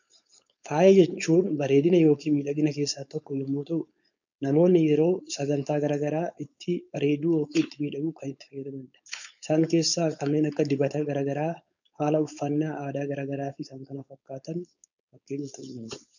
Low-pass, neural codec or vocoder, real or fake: 7.2 kHz; codec, 16 kHz, 4.8 kbps, FACodec; fake